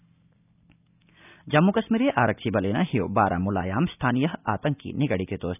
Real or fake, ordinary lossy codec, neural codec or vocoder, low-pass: real; none; none; 3.6 kHz